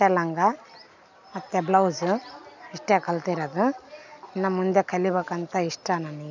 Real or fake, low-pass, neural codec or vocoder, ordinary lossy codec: real; 7.2 kHz; none; none